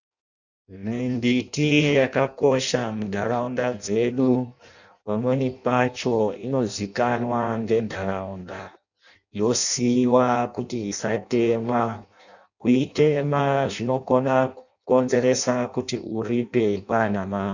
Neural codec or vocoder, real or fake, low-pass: codec, 16 kHz in and 24 kHz out, 0.6 kbps, FireRedTTS-2 codec; fake; 7.2 kHz